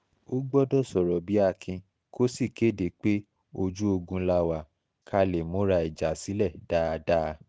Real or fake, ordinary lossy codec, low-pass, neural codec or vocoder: real; none; none; none